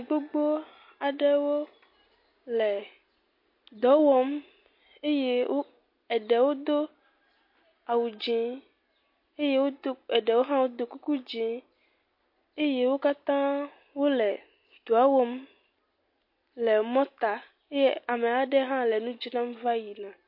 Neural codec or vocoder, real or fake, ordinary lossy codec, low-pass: none; real; MP3, 32 kbps; 5.4 kHz